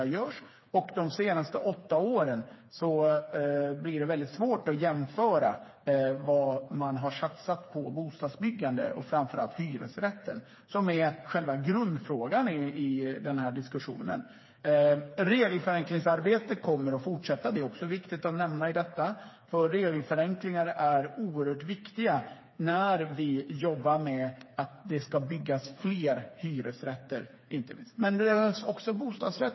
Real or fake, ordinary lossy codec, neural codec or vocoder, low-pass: fake; MP3, 24 kbps; codec, 16 kHz, 4 kbps, FreqCodec, smaller model; 7.2 kHz